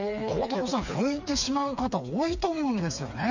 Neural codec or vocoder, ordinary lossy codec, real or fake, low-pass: codec, 16 kHz, 4 kbps, FreqCodec, smaller model; none; fake; 7.2 kHz